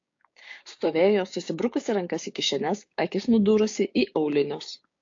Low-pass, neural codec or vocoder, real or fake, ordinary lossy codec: 7.2 kHz; codec, 16 kHz, 6 kbps, DAC; fake; AAC, 48 kbps